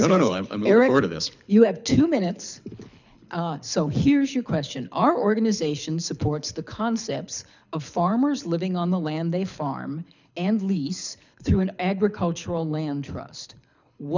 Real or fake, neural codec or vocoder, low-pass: fake; codec, 24 kHz, 6 kbps, HILCodec; 7.2 kHz